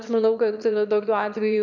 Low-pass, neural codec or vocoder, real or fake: 7.2 kHz; autoencoder, 22.05 kHz, a latent of 192 numbers a frame, VITS, trained on one speaker; fake